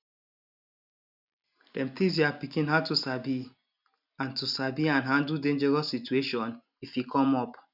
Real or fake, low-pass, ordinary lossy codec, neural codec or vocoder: real; 5.4 kHz; none; none